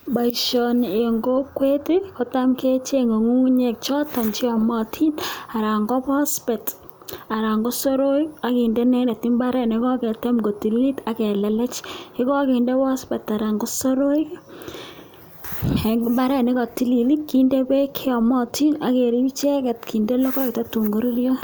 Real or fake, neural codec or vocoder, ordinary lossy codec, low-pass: real; none; none; none